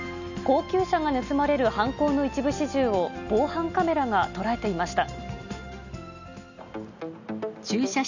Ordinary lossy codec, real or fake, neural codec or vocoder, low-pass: none; real; none; 7.2 kHz